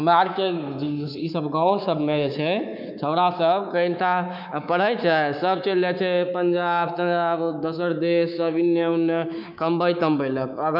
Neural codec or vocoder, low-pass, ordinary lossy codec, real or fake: codec, 24 kHz, 3.1 kbps, DualCodec; 5.4 kHz; none; fake